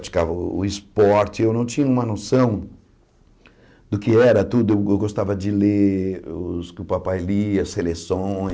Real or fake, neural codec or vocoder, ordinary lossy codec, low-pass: real; none; none; none